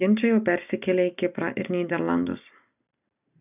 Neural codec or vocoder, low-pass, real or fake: none; 3.6 kHz; real